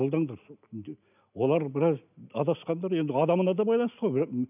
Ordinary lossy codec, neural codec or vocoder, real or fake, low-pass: none; none; real; 3.6 kHz